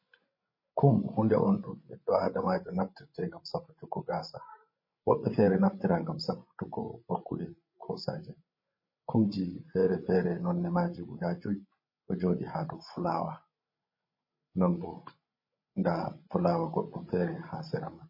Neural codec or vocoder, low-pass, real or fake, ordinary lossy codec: codec, 16 kHz, 16 kbps, FreqCodec, larger model; 5.4 kHz; fake; MP3, 24 kbps